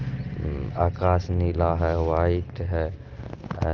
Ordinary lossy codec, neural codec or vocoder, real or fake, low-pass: Opus, 24 kbps; none; real; 7.2 kHz